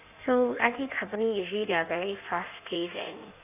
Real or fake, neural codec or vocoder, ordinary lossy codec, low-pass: fake; codec, 16 kHz in and 24 kHz out, 1.1 kbps, FireRedTTS-2 codec; none; 3.6 kHz